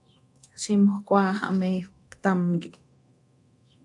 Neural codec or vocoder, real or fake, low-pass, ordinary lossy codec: codec, 24 kHz, 0.9 kbps, DualCodec; fake; 10.8 kHz; AAC, 48 kbps